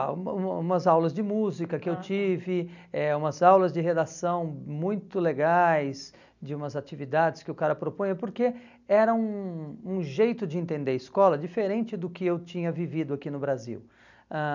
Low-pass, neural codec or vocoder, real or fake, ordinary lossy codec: 7.2 kHz; none; real; none